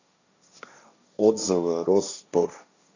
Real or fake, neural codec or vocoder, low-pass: fake; codec, 16 kHz, 1.1 kbps, Voila-Tokenizer; 7.2 kHz